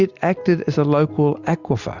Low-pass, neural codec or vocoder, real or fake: 7.2 kHz; none; real